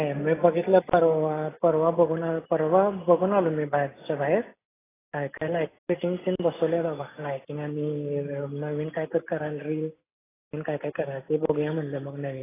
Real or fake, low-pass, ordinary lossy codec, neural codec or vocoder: real; 3.6 kHz; AAC, 16 kbps; none